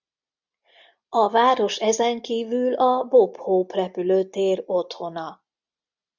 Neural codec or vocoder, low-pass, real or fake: none; 7.2 kHz; real